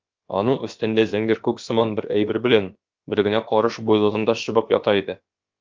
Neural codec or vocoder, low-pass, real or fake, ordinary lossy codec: codec, 16 kHz, about 1 kbps, DyCAST, with the encoder's durations; 7.2 kHz; fake; Opus, 24 kbps